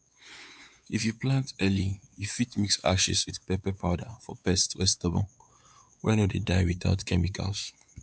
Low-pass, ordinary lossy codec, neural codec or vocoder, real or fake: none; none; codec, 16 kHz, 4 kbps, X-Codec, WavLM features, trained on Multilingual LibriSpeech; fake